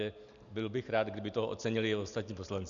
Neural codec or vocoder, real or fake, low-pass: none; real; 7.2 kHz